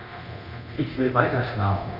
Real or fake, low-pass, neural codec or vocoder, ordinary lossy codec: fake; 5.4 kHz; codec, 24 kHz, 0.9 kbps, DualCodec; Opus, 64 kbps